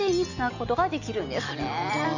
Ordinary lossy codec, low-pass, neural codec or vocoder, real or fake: none; 7.2 kHz; none; real